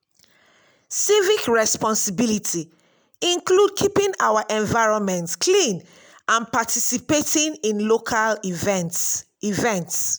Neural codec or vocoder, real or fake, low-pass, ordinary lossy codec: none; real; none; none